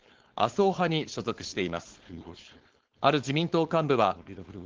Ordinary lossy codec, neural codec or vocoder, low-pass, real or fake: Opus, 16 kbps; codec, 16 kHz, 4.8 kbps, FACodec; 7.2 kHz; fake